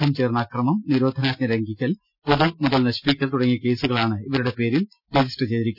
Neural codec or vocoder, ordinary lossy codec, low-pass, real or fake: none; none; 5.4 kHz; real